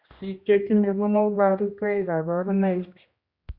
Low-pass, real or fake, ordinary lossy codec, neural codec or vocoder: 5.4 kHz; fake; Opus, 64 kbps; codec, 16 kHz, 1 kbps, X-Codec, HuBERT features, trained on general audio